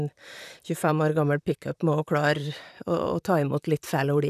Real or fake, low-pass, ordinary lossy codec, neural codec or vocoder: fake; 14.4 kHz; none; vocoder, 44.1 kHz, 128 mel bands, Pupu-Vocoder